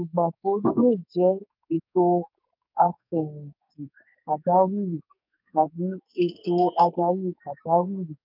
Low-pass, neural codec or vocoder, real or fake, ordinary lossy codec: 5.4 kHz; codec, 44.1 kHz, 2.6 kbps, SNAC; fake; none